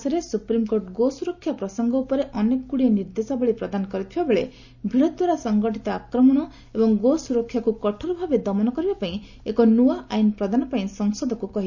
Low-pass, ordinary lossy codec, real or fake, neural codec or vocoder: 7.2 kHz; none; real; none